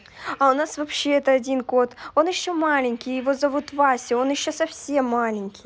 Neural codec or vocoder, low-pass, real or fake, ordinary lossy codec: none; none; real; none